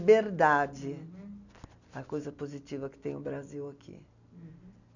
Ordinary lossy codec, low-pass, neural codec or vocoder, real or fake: none; 7.2 kHz; none; real